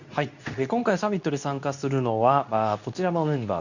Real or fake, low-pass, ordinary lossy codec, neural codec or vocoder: fake; 7.2 kHz; none; codec, 24 kHz, 0.9 kbps, WavTokenizer, medium speech release version 2